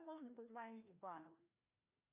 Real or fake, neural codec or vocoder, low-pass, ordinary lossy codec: fake; codec, 16 kHz, 1 kbps, FreqCodec, larger model; 3.6 kHz; Opus, 64 kbps